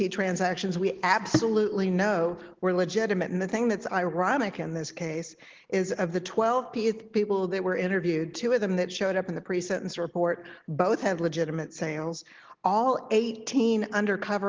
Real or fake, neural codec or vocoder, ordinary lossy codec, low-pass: real; none; Opus, 16 kbps; 7.2 kHz